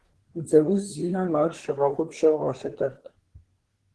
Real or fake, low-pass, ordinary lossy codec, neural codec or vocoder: fake; 10.8 kHz; Opus, 16 kbps; codec, 24 kHz, 1 kbps, SNAC